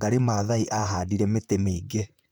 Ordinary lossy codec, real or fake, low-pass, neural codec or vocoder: none; fake; none; vocoder, 44.1 kHz, 128 mel bands, Pupu-Vocoder